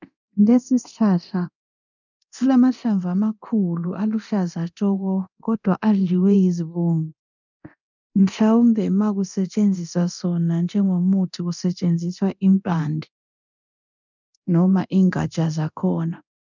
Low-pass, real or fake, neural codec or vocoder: 7.2 kHz; fake; codec, 24 kHz, 0.9 kbps, DualCodec